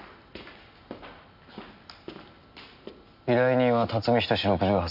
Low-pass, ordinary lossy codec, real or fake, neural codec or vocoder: 5.4 kHz; none; real; none